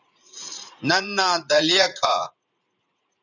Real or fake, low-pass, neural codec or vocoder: fake; 7.2 kHz; vocoder, 44.1 kHz, 80 mel bands, Vocos